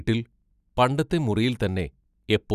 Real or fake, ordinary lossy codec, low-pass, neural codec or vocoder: real; none; 14.4 kHz; none